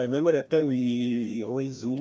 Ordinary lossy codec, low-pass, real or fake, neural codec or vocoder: none; none; fake; codec, 16 kHz, 1 kbps, FreqCodec, larger model